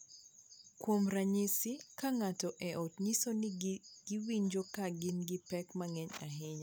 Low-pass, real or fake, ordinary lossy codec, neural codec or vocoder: none; real; none; none